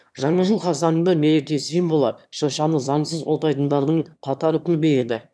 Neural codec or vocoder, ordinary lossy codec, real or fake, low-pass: autoencoder, 22.05 kHz, a latent of 192 numbers a frame, VITS, trained on one speaker; none; fake; none